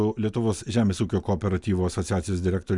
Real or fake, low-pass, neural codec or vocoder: real; 10.8 kHz; none